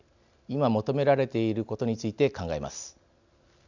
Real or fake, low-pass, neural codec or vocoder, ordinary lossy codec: real; 7.2 kHz; none; none